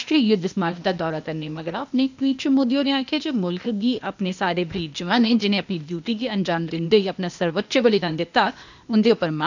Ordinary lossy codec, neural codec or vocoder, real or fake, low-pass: none; codec, 16 kHz, 0.8 kbps, ZipCodec; fake; 7.2 kHz